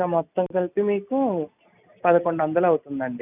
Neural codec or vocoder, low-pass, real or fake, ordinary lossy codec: none; 3.6 kHz; real; none